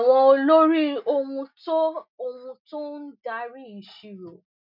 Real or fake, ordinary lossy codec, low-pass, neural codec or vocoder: real; none; 5.4 kHz; none